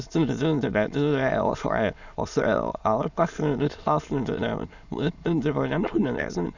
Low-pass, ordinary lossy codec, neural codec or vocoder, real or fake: 7.2 kHz; none; autoencoder, 22.05 kHz, a latent of 192 numbers a frame, VITS, trained on many speakers; fake